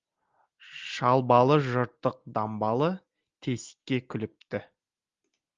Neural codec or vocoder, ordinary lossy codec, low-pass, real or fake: none; Opus, 32 kbps; 7.2 kHz; real